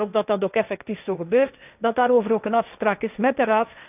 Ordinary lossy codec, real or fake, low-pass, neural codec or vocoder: none; fake; 3.6 kHz; codec, 16 kHz, 1.1 kbps, Voila-Tokenizer